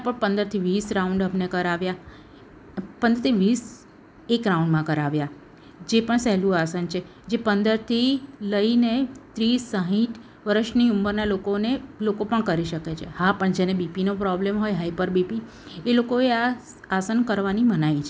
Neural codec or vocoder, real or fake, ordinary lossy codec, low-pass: none; real; none; none